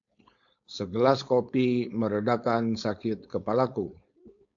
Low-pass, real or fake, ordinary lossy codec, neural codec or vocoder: 7.2 kHz; fake; AAC, 64 kbps; codec, 16 kHz, 4.8 kbps, FACodec